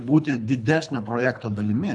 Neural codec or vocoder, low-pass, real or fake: codec, 24 kHz, 3 kbps, HILCodec; 10.8 kHz; fake